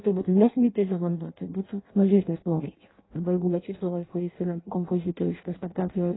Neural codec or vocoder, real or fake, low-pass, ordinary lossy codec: codec, 16 kHz in and 24 kHz out, 0.6 kbps, FireRedTTS-2 codec; fake; 7.2 kHz; AAC, 16 kbps